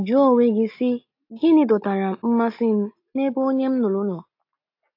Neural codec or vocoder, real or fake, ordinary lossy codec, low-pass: none; real; AAC, 32 kbps; 5.4 kHz